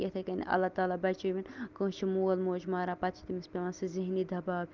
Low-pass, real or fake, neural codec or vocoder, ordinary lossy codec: 7.2 kHz; real; none; Opus, 32 kbps